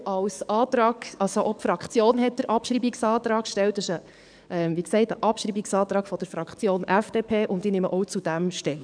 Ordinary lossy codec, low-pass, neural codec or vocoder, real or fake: none; 9.9 kHz; codec, 44.1 kHz, 7.8 kbps, DAC; fake